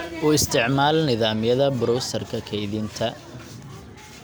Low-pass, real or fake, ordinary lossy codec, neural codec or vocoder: none; real; none; none